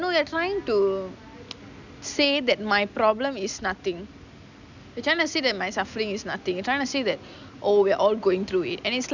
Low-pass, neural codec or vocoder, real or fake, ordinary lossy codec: 7.2 kHz; none; real; none